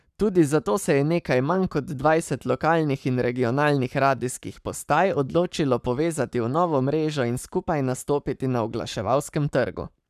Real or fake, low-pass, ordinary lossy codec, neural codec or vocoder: fake; 14.4 kHz; none; codec, 44.1 kHz, 7.8 kbps, Pupu-Codec